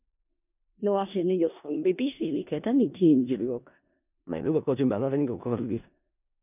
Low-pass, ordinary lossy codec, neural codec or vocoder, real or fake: 3.6 kHz; none; codec, 16 kHz in and 24 kHz out, 0.4 kbps, LongCat-Audio-Codec, four codebook decoder; fake